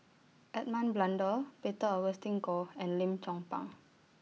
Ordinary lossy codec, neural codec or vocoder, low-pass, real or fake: none; none; none; real